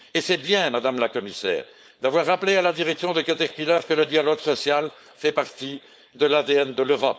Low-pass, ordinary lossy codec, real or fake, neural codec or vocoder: none; none; fake; codec, 16 kHz, 4.8 kbps, FACodec